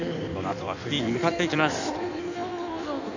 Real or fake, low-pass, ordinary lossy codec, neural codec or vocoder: fake; 7.2 kHz; none; codec, 16 kHz in and 24 kHz out, 1.1 kbps, FireRedTTS-2 codec